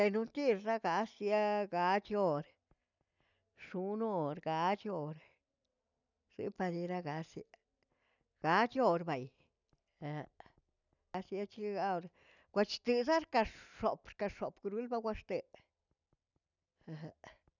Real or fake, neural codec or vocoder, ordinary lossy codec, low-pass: real; none; none; 7.2 kHz